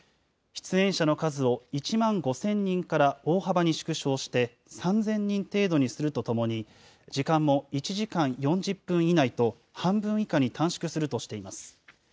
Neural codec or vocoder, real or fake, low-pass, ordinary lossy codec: none; real; none; none